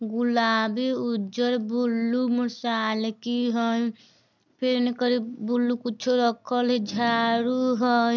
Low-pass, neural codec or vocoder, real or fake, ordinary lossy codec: 7.2 kHz; none; real; none